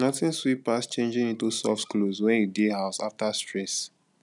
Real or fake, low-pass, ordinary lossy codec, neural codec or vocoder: real; 10.8 kHz; none; none